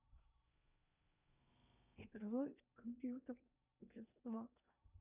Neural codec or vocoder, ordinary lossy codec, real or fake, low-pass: codec, 16 kHz in and 24 kHz out, 0.8 kbps, FocalCodec, streaming, 65536 codes; none; fake; 3.6 kHz